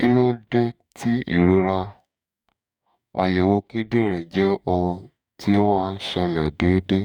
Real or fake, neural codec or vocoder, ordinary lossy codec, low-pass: fake; codec, 44.1 kHz, 2.6 kbps, DAC; none; 19.8 kHz